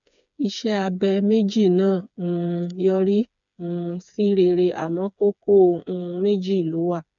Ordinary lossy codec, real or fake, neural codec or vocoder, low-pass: MP3, 96 kbps; fake; codec, 16 kHz, 4 kbps, FreqCodec, smaller model; 7.2 kHz